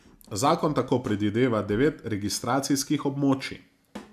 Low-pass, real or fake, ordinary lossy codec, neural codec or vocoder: 14.4 kHz; real; none; none